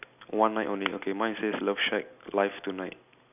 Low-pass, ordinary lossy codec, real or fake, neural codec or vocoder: 3.6 kHz; none; real; none